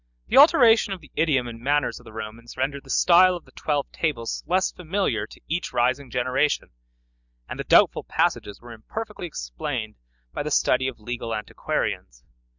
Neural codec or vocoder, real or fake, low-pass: none; real; 7.2 kHz